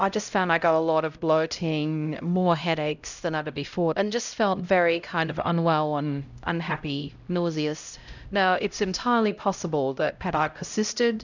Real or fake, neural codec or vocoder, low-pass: fake; codec, 16 kHz, 0.5 kbps, X-Codec, HuBERT features, trained on LibriSpeech; 7.2 kHz